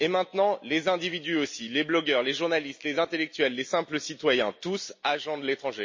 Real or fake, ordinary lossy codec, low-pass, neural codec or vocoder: real; MP3, 32 kbps; 7.2 kHz; none